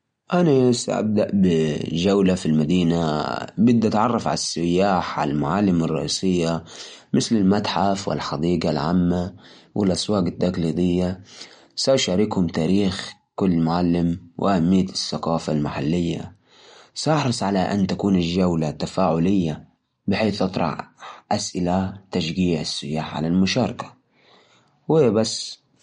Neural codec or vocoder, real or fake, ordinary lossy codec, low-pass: none; real; MP3, 48 kbps; 9.9 kHz